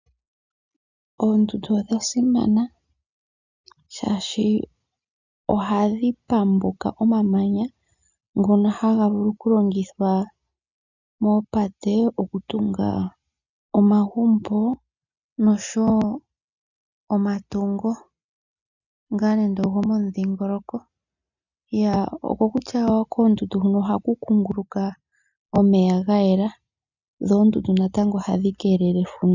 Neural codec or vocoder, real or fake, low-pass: none; real; 7.2 kHz